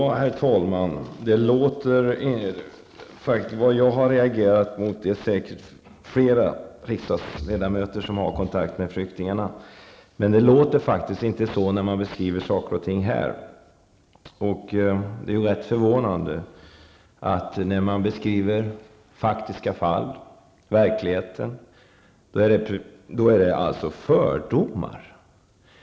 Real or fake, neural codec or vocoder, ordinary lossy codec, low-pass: real; none; none; none